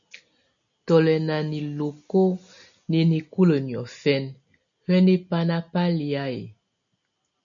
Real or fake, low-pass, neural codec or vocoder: real; 7.2 kHz; none